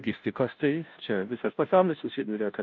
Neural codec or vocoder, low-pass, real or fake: codec, 16 kHz, 0.5 kbps, FunCodec, trained on Chinese and English, 25 frames a second; 7.2 kHz; fake